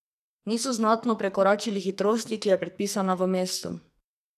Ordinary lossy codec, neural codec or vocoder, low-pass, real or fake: none; codec, 44.1 kHz, 2.6 kbps, SNAC; 14.4 kHz; fake